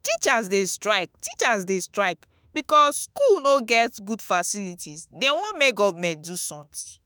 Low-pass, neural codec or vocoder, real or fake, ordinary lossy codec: none; autoencoder, 48 kHz, 32 numbers a frame, DAC-VAE, trained on Japanese speech; fake; none